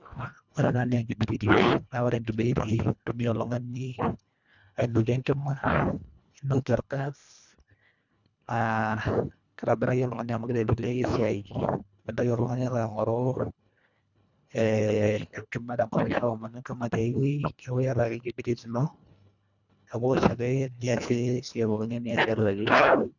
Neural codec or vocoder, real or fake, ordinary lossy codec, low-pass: codec, 24 kHz, 1.5 kbps, HILCodec; fake; none; 7.2 kHz